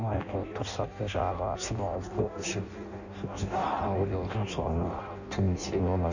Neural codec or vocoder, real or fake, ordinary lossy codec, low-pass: codec, 16 kHz in and 24 kHz out, 0.6 kbps, FireRedTTS-2 codec; fake; none; 7.2 kHz